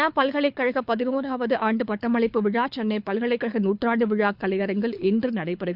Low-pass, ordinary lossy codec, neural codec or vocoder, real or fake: 5.4 kHz; Opus, 64 kbps; codec, 24 kHz, 6 kbps, HILCodec; fake